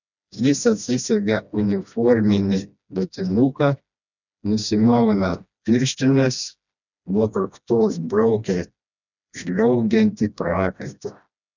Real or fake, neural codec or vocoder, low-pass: fake; codec, 16 kHz, 1 kbps, FreqCodec, smaller model; 7.2 kHz